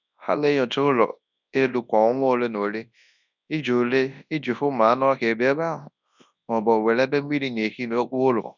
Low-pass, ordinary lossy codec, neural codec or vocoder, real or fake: 7.2 kHz; none; codec, 24 kHz, 0.9 kbps, WavTokenizer, large speech release; fake